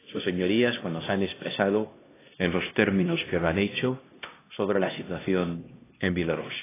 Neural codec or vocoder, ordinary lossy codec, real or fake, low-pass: codec, 16 kHz, 1 kbps, X-Codec, HuBERT features, trained on LibriSpeech; AAC, 16 kbps; fake; 3.6 kHz